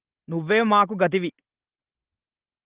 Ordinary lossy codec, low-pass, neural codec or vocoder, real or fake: Opus, 16 kbps; 3.6 kHz; none; real